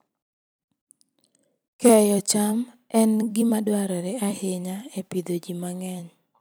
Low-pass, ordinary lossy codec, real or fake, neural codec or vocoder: none; none; fake; vocoder, 44.1 kHz, 128 mel bands every 256 samples, BigVGAN v2